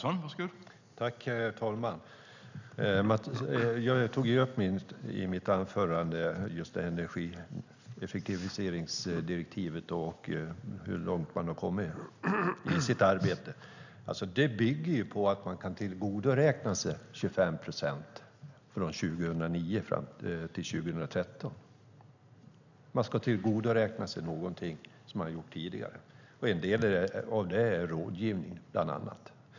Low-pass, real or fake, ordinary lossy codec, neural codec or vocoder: 7.2 kHz; real; none; none